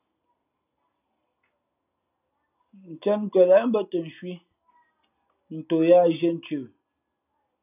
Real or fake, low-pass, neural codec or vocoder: real; 3.6 kHz; none